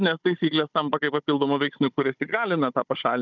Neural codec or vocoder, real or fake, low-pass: codec, 16 kHz, 16 kbps, FunCodec, trained on Chinese and English, 50 frames a second; fake; 7.2 kHz